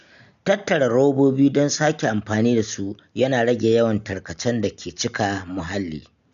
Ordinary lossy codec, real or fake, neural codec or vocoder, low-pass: none; real; none; 7.2 kHz